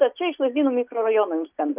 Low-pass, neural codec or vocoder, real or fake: 3.6 kHz; none; real